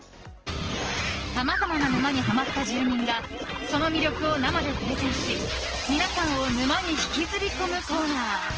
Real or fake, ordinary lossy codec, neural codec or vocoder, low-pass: real; Opus, 16 kbps; none; 7.2 kHz